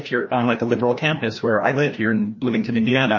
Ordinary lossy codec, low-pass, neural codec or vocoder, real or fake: MP3, 32 kbps; 7.2 kHz; codec, 16 kHz, 1 kbps, FreqCodec, larger model; fake